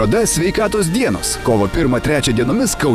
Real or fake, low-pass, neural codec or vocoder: fake; 14.4 kHz; vocoder, 48 kHz, 128 mel bands, Vocos